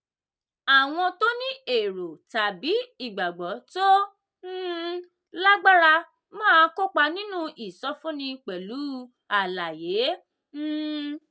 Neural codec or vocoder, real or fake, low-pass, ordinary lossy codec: none; real; none; none